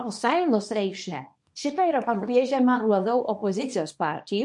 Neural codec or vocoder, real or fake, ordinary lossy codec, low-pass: codec, 24 kHz, 0.9 kbps, WavTokenizer, small release; fake; MP3, 48 kbps; 10.8 kHz